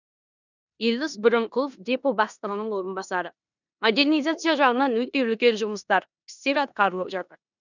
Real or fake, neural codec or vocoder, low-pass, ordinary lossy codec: fake; codec, 16 kHz in and 24 kHz out, 0.9 kbps, LongCat-Audio-Codec, four codebook decoder; 7.2 kHz; none